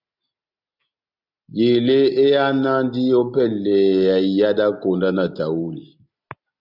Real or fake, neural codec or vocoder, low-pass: real; none; 5.4 kHz